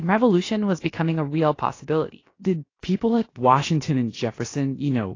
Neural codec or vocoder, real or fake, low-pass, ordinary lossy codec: codec, 16 kHz, about 1 kbps, DyCAST, with the encoder's durations; fake; 7.2 kHz; AAC, 32 kbps